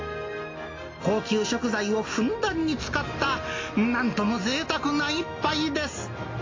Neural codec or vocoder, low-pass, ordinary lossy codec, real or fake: none; 7.2 kHz; AAC, 32 kbps; real